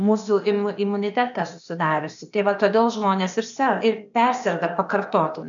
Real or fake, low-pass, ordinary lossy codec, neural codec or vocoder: fake; 7.2 kHz; MP3, 96 kbps; codec, 16 kHz, 0.8 kbps, ZipCodec